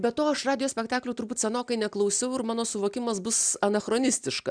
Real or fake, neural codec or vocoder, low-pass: real; none; 9.9 kHz